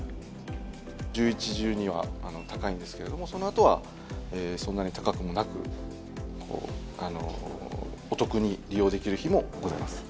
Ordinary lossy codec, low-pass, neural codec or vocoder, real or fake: none; none; none; real